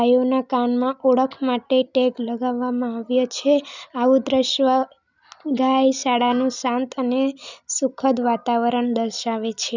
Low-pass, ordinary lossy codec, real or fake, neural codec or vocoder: 7.2 kHz; none; real; none